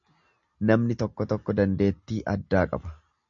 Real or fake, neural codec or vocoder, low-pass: real; none; 7.2 kHz